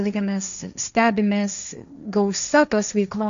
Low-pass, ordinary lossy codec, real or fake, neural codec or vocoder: 7.2 kHz; AAC, 96 kbps; fake; codec, 16 kHz, 1.1 kbps, Voila-Tokenizer